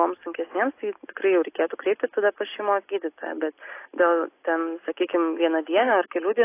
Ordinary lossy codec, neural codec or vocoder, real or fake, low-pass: AAC, 24 kbps; none; real; 3.6 kHz